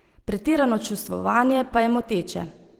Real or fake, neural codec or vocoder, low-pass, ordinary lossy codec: fake; vocoder, 44.1 kHz, 128 mel bands every 512 samples, BigVGAN v2; 19.8 kHz; Opus, 16 kbps